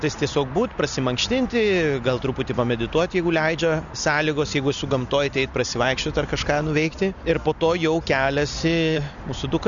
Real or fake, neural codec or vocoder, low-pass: real; none; 7.2 kHz